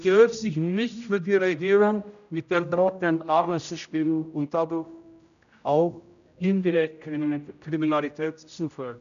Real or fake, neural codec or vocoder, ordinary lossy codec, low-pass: fake; codec, 16 kHz, 0.5 kbps, X-Codec, HuBERT features, trained on general audio; none; 7.2 kHz